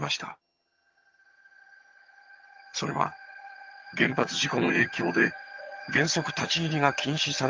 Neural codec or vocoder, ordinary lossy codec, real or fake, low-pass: vocoder, 22.05 kHz, 80 mel bands, HiFi-GAN; Opus, 24 kbps; fake; 7.2 kHz